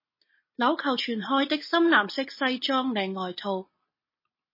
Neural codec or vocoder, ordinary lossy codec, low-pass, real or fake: none; MP3, 24 kbps; 5.4 kHz; real